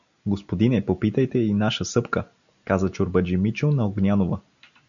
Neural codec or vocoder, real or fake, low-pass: none; real; 7.2 kHz